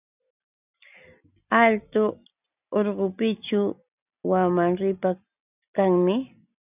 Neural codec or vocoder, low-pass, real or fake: none; 3.6 kHz; real